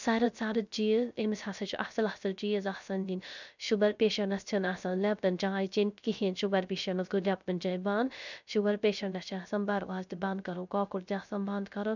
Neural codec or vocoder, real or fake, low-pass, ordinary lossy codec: codec, 16 kHz, 0.3 kbps, FocalCodec; fake; 7.2 kHz; none